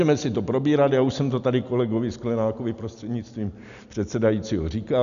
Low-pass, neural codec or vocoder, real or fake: 7.2 kHz; none; real